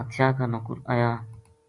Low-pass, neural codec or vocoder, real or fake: 10.8 kHz; none; real